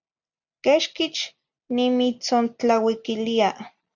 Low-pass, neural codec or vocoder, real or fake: 7.2 kHz; none; real